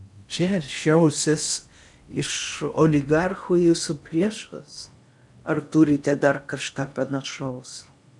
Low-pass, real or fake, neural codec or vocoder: 10.8 kHz; fake; codec, 16 kHz in and 24 kHz out, 0.6 kbps, FocalCodec, streaming, 4096 codes